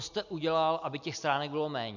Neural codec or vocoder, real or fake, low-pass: none; real; 7.2 kHz